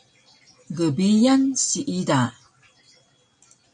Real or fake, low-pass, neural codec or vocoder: real; 9.9 kHz; none